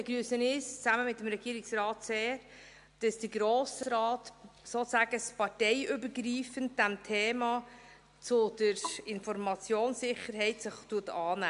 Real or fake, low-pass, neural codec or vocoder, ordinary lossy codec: real; 10.8 kHz; none; MP3, 64 kbps